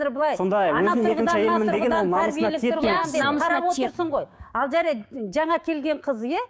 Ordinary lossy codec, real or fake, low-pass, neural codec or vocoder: none; fake; none; codec, 16 kHz, 6 kbps, DAC